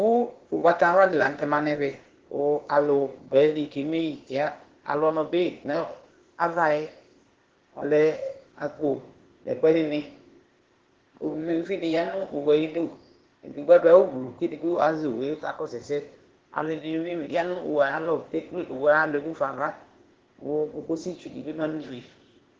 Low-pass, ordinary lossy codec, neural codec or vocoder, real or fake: 7.2 kHz; Opus, 16 kbps; codec, 16 kHz, 0.8 kbps, ZipCodec; fake